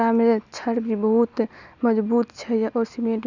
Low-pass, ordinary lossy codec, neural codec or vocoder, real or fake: 7.2 kHz; none; none; real